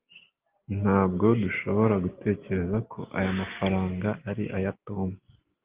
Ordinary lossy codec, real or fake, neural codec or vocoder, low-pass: Opus, 32 kbps; real; none; 3.6 kHz